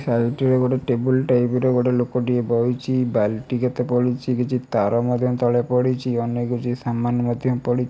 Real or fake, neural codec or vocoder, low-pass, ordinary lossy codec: real; none; none; none